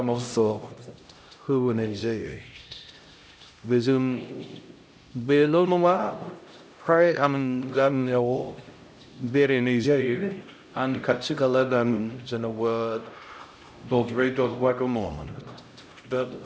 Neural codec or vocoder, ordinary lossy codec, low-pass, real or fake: codec, 16 kHz, 0.5 kbps, X-Codec, HuBERT features, trained on LibriSpeech; none; none; fake